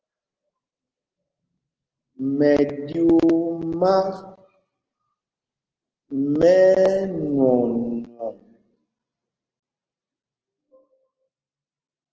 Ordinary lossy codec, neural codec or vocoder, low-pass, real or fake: Opus, 16 kbps; none; 7.2 kHz; real